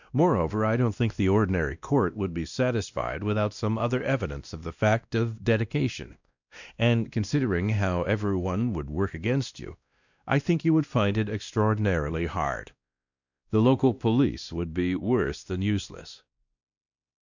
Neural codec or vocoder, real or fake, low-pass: codec, 16 kHz, 1 kbps, X-Codec, WavLM features, trained on Multilingual LibriSpeech; fake; 7.2 kHz